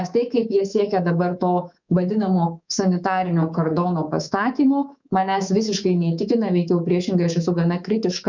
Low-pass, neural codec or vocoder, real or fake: 7.2 kHz; codec, 24 kHz, 3.1 kbps, DualCodec; fake